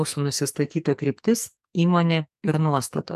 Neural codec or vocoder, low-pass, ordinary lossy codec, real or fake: codec, 44.1 kHz, 2.6 kbps, SNAC; 14.4 kHz; AAC, 96 kbps; fake